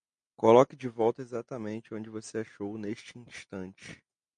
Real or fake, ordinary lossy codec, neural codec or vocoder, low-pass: real; MP3, 64 kbps; none; 9.9 kHz